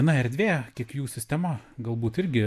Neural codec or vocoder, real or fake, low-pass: none; real; 14.4 kHz